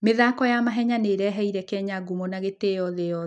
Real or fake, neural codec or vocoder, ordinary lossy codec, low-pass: real; none; none; none